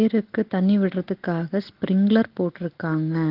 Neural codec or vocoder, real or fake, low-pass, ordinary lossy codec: none; real; 5.4 kHz; Opus, 16 kbps